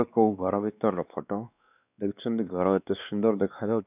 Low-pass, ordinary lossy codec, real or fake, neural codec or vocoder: 3.6 kHz; none; fake; codec, 16 kHz, 2 kbps, X-Codec, WavLM features, trained on Multilingual LibriSpeech